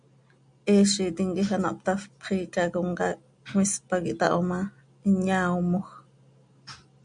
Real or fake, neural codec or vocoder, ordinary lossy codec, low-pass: real; none; MP3, 64 kbps; 9.9 kHz